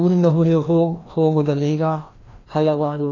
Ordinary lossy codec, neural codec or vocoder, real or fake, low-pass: AAC, 32 kbps; codec, 16 kHz, 1 kbps, FreqCodec, larger model; fake; 7.2 kHz